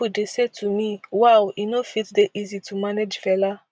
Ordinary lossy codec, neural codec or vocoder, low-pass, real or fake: none; none; none; real